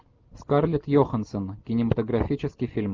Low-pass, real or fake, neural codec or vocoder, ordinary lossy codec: 7.2 kHz; real; none; Opus, 32 kbps